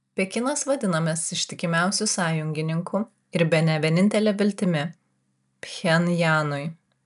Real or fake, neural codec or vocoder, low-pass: real; none; 10.8 kHz